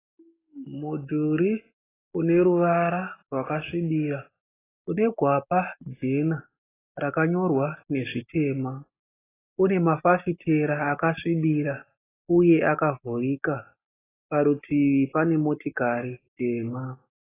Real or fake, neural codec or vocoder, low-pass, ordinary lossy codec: real; none; 3.6 kHz; AAC, 16 kbps